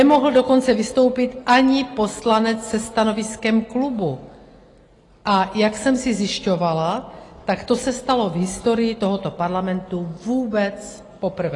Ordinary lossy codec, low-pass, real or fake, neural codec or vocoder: AAC, 32 kbps; 10.8 kHz; real; none